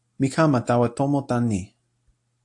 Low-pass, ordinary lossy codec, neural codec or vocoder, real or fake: 10.8 kHz; AAC, 64 kbps; none; real